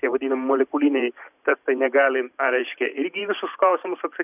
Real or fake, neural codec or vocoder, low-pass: fake; vocoder, 44.1 kHz, 128 mel bands every 512 samples, BigVGAN v2; 3.6 kHz